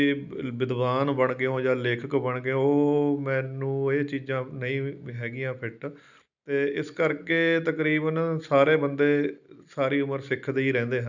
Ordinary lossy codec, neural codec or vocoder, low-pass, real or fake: none; none; 7.2 kHz; real